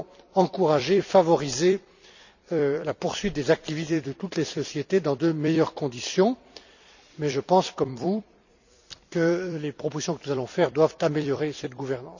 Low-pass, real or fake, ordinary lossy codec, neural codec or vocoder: 7.2 kHz; fake; none; vocoder, 44.1 kHz, 128 mel bands every 256 samples, BigVGAN v2